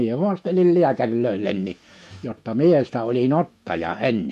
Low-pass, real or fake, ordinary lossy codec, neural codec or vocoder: 14.4 kHz; fake; MP3, 64 kbps; autoencoder, 48 kHz, 128 numbers a frame, DAC-VAE, trained on Japanese speech